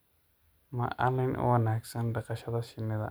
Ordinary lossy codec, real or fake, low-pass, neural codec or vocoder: none; real; none; none